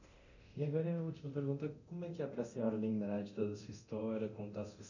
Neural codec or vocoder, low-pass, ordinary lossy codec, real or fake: codec, 24 kHz, 0.9 kbps, DualCodec; 7.2 kHz; none; fake